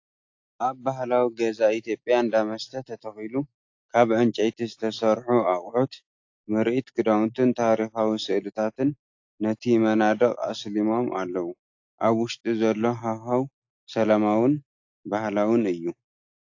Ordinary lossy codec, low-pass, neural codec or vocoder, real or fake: AAC, 48 kbps; 7.2 kHz; none; real